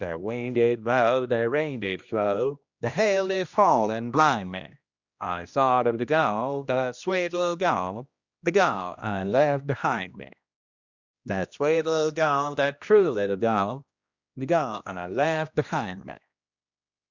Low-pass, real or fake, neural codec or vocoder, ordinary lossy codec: 7.2 kHz; fake; codec, 16 kHz, 1 kbps, X-Codec, HuBERT features, trained on general audio; Opus, 64 kbps